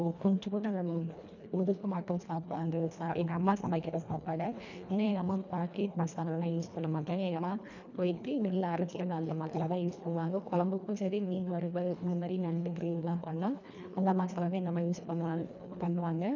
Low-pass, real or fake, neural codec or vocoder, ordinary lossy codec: 7.2 kHz; fake; codec, 24 kHz, 1.5 kbps, HILCodec; none